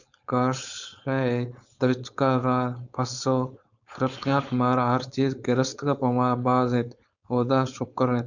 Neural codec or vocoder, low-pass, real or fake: codec, 16 kHz, 4.8 kbps, FACodec; 7.2 kHz; fake